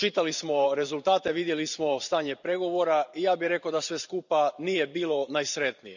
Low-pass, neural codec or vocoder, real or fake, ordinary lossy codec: 7.2 kHz; vocoder, 44.1 kHz, 128 mel bands every 512 samples, BigVGAN v2; fake; none